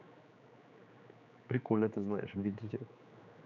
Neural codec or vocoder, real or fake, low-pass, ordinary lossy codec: codec, 16 kHz, 4 kbps, X-Codec, HuBERT features, trained on general audio; fake; 7.2 kHz; none